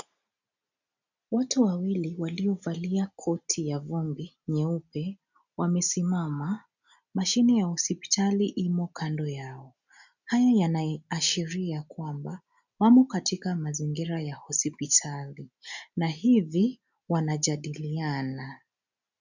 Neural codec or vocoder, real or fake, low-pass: none; real; 7.2 kHz